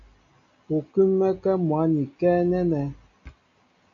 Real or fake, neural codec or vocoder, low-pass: real; none; 7.2 kHz